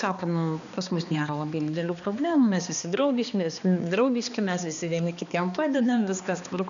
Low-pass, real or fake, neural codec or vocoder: 7.2 kHz; fake; codec, 16 kHz, 2 kbps, X-Codec, HuBERT features, trained on balanced general audio